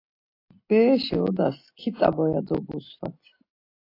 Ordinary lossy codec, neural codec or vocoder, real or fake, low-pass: AAC, 32 kbps; none; real; 5.4 kHz